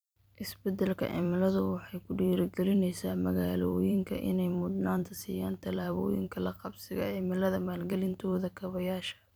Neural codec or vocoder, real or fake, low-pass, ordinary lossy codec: vocoder, 44.1 kHz, 128 mel bands every 256 samples, BigVGAN v2; fake; none; none